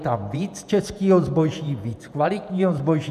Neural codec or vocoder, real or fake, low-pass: vocoder, 48 kHz, 128 mel bands, Vocos; fake; 14.4 kHz